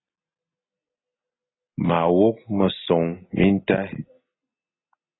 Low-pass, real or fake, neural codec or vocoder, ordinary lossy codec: 7.2 kHz; real; none; AAC, 16 kbps